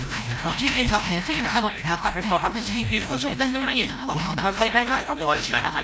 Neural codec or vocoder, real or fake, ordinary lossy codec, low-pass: codec, 16 kHz, 0.5 kbps, FreqCodec, larger model; fake; none; none